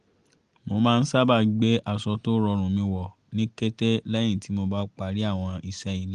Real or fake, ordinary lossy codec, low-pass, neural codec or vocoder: real; Opus, 24 kbps; 9.9 kHz; none